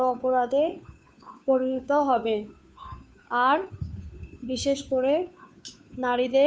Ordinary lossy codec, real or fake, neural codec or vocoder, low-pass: none; fake; codec, 16 kHz, 2 kbps, FunCodec, trained on Chinese and English, 25 frames a second; none